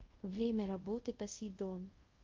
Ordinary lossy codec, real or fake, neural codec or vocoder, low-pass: Opus, 32 kbps; fake; codec, 24 kHz, 0.5 kbps, DualCodec; 7.2 kHz